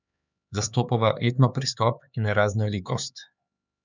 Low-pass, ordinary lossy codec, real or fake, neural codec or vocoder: 7.2 kHz; none; fake; codec, 16 kHz, 4 kbps, X-Codec, HuBERT features, trained on LibriSpeech